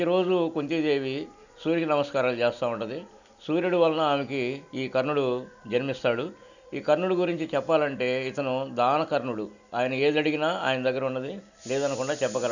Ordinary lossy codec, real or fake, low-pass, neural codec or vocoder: none; real; 7.2 kHz; none